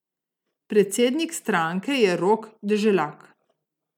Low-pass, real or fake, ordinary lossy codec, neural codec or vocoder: 19.8 kHz; real; none; none